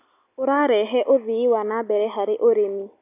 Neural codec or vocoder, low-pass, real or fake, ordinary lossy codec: none; 3.6 kHz; real; AAC, 24 kbps